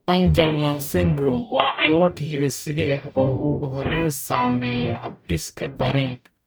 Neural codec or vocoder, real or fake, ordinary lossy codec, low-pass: codec, 44.1 kHz, 0.9 kbps, DAC; fake; none; none